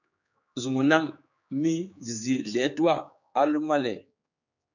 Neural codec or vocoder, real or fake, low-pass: codec, 16 kHz, 4 kbps, X-Codec, HuBERT features, trained on general audio; fake; 7.2 kHz